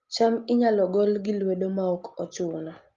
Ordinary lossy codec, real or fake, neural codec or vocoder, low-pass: Opus, 32 kbps; real; none; 7.2 kHz